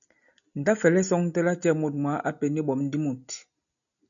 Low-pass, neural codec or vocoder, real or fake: 7.2 kHz; none; real